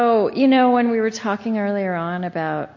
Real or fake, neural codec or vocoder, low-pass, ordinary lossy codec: real; none; 7.2 kHz; MP3, 32 kbps